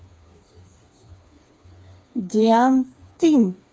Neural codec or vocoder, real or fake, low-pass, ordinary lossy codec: codec, 16 kHz, 4 kbps, FreqCodec, smaller model; fake; none; none